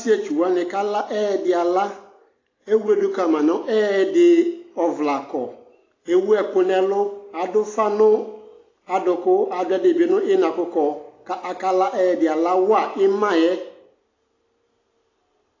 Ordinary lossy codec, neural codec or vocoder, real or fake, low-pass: AAC, 32 kbps; none; real; 7.2 kHz